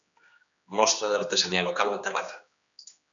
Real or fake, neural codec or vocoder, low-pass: fake; codec, 16 kHz, 2 kbps, X-Codec, HuBERT features, trained on general audio; 7.2 kHz